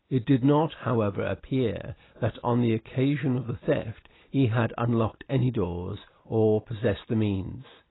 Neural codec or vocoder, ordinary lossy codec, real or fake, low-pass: none; AAC, 16 kbps; real; 7.2 kHz